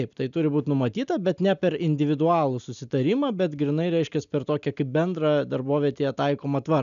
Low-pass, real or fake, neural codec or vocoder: 7.2 kHz; real; none